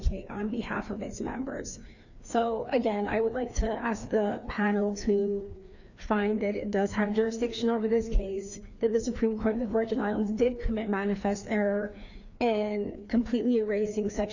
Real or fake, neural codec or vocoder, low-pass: fake; codec, 16 kHz, 2 kbps, FreqCodec, larger model; 7.2 kHz